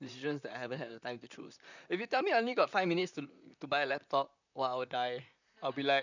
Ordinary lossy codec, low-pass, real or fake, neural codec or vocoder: none; 7.2 kHz; fake; vocoder, 44.1 kHz, 128 mel bands, Pupu-Vocoder